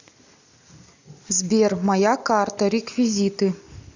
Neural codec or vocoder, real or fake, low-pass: none; real; 7.2 kHz